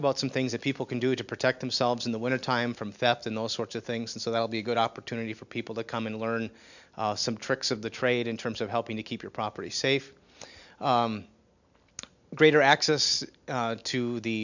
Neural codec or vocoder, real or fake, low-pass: none; real; 7.2 kHz